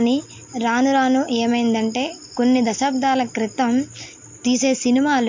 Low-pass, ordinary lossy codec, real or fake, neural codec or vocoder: 7.2 kHz; MP3, 48 kbps; real; none